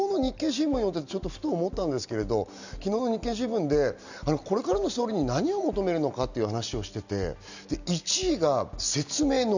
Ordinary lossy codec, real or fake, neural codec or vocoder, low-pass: none; real; none; 7.2 kHz